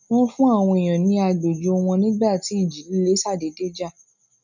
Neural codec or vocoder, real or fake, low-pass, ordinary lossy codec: none; real; 7.2 kHz; none